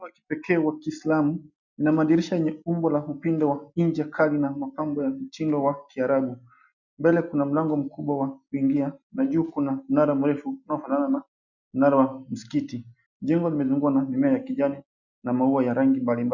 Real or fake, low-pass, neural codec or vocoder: real; 7.2 kHz; none